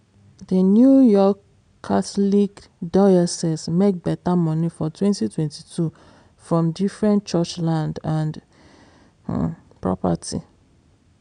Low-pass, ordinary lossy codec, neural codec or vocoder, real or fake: 9.9 kHz; none; none; real